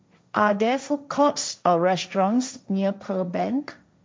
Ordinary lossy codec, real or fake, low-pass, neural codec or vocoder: none; fake; none; codec, 16 kHz, 1.1 kbps, Voila-Tokenizer